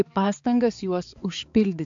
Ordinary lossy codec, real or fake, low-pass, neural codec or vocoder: AAC, 64 kbps; fake; 7.2 kHz; codec, 16 kHz, 4 kbps, X-Codec, HuBERT features, trained on balanced general audio